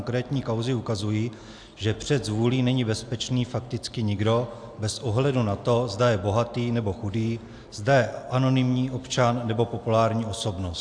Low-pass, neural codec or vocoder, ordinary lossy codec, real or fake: 9.9 kHz; none; AAC, 64 kbps; real